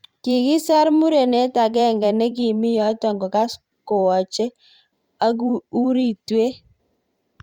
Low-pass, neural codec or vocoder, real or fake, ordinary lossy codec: 19.8 kHz; vocoder, 44.1 kHz, 128 mel bands every 512 samples, BigVGAN v2; fake; Opus, 64 kbps